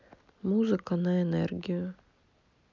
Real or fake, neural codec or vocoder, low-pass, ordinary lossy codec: real; none; 7.2 kHz; none